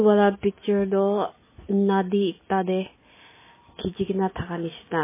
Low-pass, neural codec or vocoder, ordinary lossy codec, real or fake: 3.6 kHz; none; MP3, 16 kbps; real